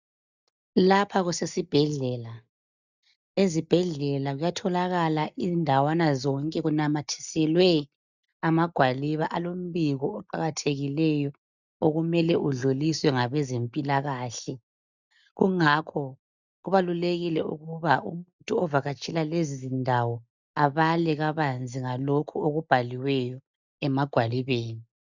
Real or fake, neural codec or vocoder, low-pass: real; none; 7.2 kHz